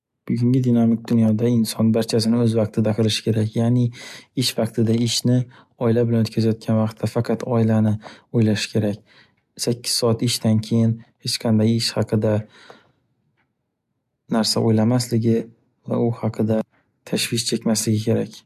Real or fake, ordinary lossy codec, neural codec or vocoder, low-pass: real; MP3, 96 kbps; none; 14.4 kHz